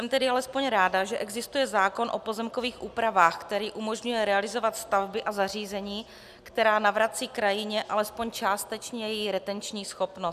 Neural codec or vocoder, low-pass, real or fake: none; 14.4 kHz; real